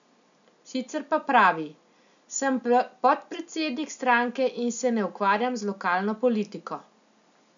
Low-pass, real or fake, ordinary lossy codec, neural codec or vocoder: 7.2 kHz; real; none; none